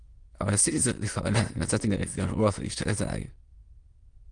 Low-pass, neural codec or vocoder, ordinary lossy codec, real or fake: 9.9 kHz; autoencoder, 22.05 kHz, a latent of 192 numbers a frame, VITS, trained on many speakers; Opus, 24 kbps; fake